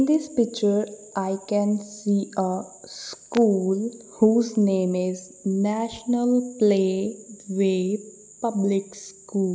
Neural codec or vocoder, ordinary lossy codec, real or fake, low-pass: none; none; real; none